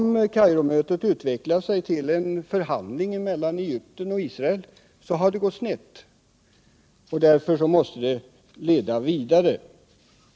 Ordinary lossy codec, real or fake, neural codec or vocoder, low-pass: none; real; none; none